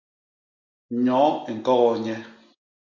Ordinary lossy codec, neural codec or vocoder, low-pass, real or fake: MP3, 48 kbps; none; 7.2 kHz; real